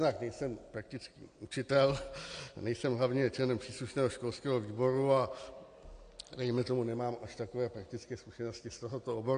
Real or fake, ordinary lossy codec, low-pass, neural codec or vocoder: real; AAC, 48 kbps; 9.9 kHz; none